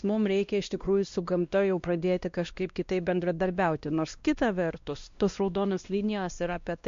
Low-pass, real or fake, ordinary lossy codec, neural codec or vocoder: 7.2 kHz; fake; MP3, 48 kbps; codec, 16 kHz, 1 kbps, X-Codec, WavLM features, trained on Multilingual LibriSpeech